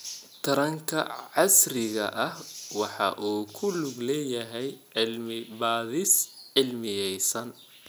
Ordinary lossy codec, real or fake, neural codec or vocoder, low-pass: none; real; none; none